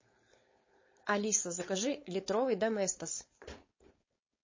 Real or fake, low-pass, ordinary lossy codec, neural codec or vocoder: fake; 7.2 kHz; MP3, 32 kbps; codec, 16 kHz, 4.8 kbps, FACodec